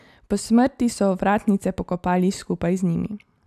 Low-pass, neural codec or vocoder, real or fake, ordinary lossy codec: 14.4 kHz; none; real; none